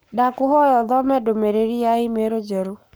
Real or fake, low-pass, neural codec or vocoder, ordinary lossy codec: fake; none; codec, 44.1 kHz, 7.8 kbps, Pupu-Codec; none